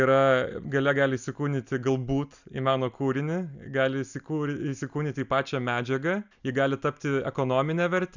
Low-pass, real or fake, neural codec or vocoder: 7.2 kHz; real; none